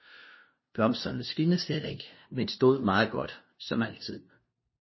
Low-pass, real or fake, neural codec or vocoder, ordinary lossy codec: 7.2 kHz; fake; codec, 16 kHz, 0.5 kbps, FunCodec, trained on LibriTTS, 25 frames a second; MP3, 24 kbps